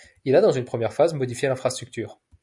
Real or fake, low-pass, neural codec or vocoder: real; 10.8 kHz; none